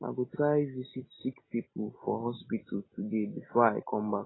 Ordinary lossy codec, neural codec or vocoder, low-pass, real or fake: AAC, 16 kbps; none; 7.2 kHz; real